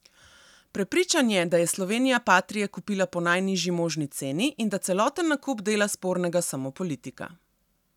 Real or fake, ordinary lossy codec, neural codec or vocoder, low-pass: real; none; none; 19.8 kHz